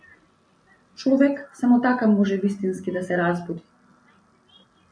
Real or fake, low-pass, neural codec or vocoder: real; 9.9 kHz; none